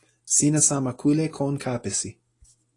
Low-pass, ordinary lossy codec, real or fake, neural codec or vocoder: 10.8 kHz; AAC, 32 kbps; real; none